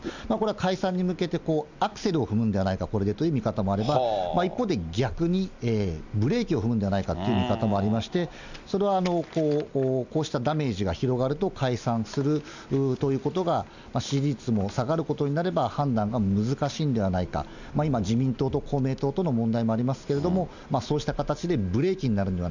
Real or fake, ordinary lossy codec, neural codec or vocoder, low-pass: real; none; none; 7.2 kHz